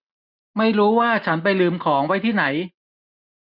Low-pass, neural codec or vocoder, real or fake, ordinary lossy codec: 5.4 kHz; none; real; none